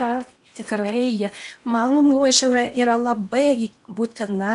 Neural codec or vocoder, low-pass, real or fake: codec, 16 kHz in and 24 kHz out, 0.8 kbps, FocalCodec, streaming, 65536 codes; 10.8 kHz; fake